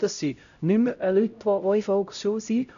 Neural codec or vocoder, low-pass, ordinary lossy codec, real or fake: codec, 16 kHz, 0.5 kbps, X-Codec, HuBERT features, trained on LibriSpeech; 7.2 kHz; none; fake